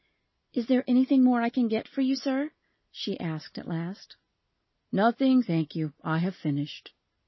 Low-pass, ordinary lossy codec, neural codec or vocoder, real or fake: 7.2 kHz; MP3, 24 kbps; none; real